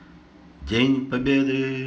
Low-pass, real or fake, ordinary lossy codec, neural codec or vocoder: none; real; none; none